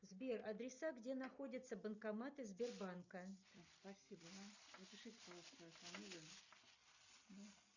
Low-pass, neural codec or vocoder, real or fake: 7.2 kHz; none; real